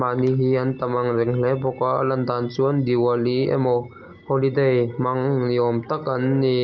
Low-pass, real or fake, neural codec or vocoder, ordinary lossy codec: 7.2 kHz; real; none; Opus, 32 kbps